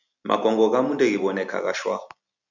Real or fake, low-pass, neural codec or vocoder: real; 7.2 kHz; none